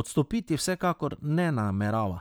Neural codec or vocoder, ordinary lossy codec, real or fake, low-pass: vocoder, 44.1 kHz, 128 mel bands every 512 samples, BigVGAN v2; none; fake; none